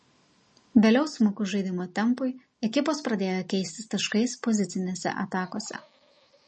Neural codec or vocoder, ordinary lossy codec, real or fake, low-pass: none; MP3, 32 kbps; real; 9.9 kHz